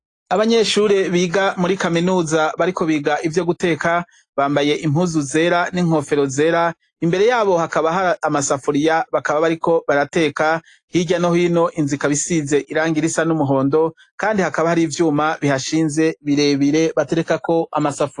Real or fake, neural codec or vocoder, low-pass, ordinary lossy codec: real; none; 10.8 kHz; AAC, 48 kbps